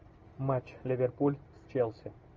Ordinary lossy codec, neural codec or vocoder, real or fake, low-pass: Opus, 24 kbps; none; real; 7.2 kHz